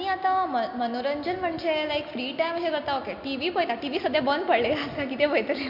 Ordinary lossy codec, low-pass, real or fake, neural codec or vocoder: MP3, 48 kbps; 5.4 kHz; real; none